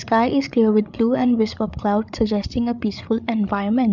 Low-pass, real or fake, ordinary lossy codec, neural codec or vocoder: 7.2 kHz; fake; none; codec, 16 kHz, 8 kbps, FreqCodec, larger model